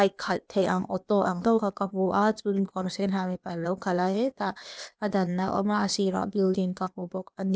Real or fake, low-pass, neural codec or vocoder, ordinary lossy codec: fake; none; codec, 16 kHz, 0.8 kbps, ZipCodec; none